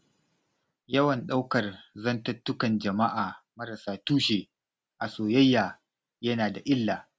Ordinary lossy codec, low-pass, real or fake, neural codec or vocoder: none; none; real; none